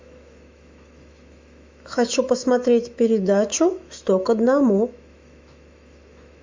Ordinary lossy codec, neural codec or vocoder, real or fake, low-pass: MP3, 64 kbps; autoencoder, 48 kHz, 128 numbers a frame, DAC-VAE, trained on Japanese speech; fake; 7.2 kHz